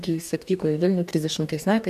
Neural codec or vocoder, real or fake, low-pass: codec, 44.1 kHz, 2.6 kbps, DAC; fake; 14.4 kHz